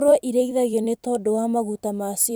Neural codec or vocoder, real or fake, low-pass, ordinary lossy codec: vocoder, 44.1 kHz, 128 mel bands every 256 samples, BigVGAN v2; fake; none; none